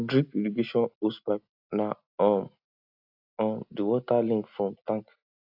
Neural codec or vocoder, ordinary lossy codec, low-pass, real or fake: none; AAC, 48 kbps; 5.4 kHz; real